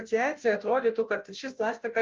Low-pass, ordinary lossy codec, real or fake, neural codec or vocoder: 7.2 kHz; Opus, 24 kbps; fake; codec, 16 kHz, 0.5 kbps, FunCodec, trained on Chinese and English, 25 frames a second